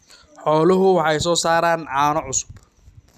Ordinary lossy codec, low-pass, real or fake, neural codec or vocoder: none; 14.4 kHz; real; none